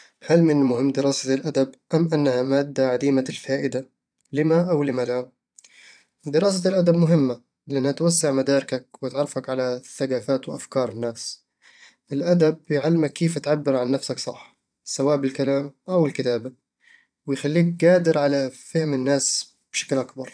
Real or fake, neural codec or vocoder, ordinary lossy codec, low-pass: fake; vocoder, 22.05 kHz, 80 mel bands, Vocos; none; 9.9 kHz